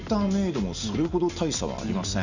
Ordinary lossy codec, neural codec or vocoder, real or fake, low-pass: none; none; real; 7.2 kHz